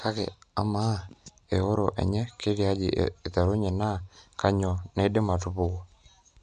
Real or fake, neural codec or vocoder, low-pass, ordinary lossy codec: real; none; 9.9 kHz; none